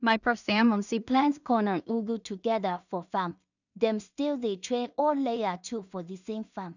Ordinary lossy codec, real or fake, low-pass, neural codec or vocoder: none; fake; 7.2 kHz; codec, 16 kHz in and 24 kHz out, 0.4 kbps, LongCat-Audio-Codec, two codebook decoder